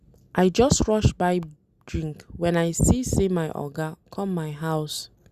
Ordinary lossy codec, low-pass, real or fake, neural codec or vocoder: Opus, 64 kbps; 14.4 kHz; real; none